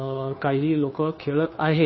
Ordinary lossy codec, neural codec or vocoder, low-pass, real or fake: MP3, 24 kbps; codec, 16 kHz, 2 kbps, FunCodec, trained on Chinese and English, 25 frames a second; 7.2 kHz; fake